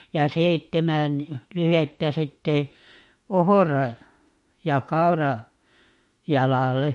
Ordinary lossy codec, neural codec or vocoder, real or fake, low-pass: MP3, 48 kbps; autoencoder, 48 kHz, 32 numbers a frame, DAC-VAE, trained on Japanese speech; fake; 14.4 kHz